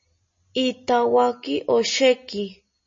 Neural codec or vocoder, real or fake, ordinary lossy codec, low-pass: none; real; MP3, 32 kbps; 7.2 kHz